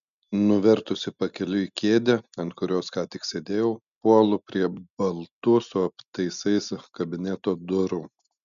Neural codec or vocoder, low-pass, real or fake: none; 7.2 kHz; real